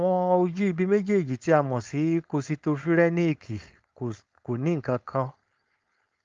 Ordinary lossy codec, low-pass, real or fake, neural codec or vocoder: Opus, 32 kbps; 7.2 kHz; fake; codec, 16 kHz, 4.8 kbps, FACodec